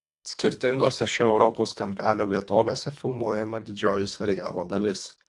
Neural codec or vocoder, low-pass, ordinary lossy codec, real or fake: codec, 24 kHz, 1.5 kbps, HILCodec; 10.8 kHz; AAC, 64 kbps; fake